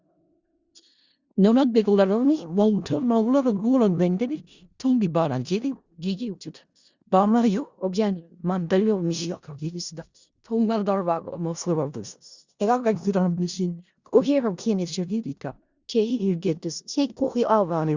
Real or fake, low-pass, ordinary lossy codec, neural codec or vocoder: fake; 7.2 kHz; Opus, 64 kbps; codec, 16 kHz in and 24 kHz out, 0.4 kbps, LongCat-Audio-Codec, four codebook decoder